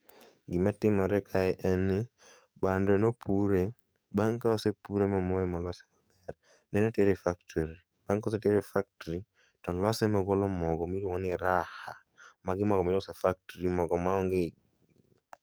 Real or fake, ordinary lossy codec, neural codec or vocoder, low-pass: fake; none; codec, 44.1 kHz, 7.8 kbps, DAC; none